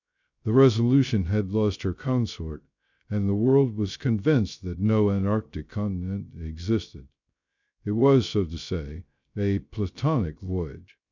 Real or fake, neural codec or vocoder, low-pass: fake; codec, 16 kHz, 0.3 kbps, FocalCodec; 7.2 kHz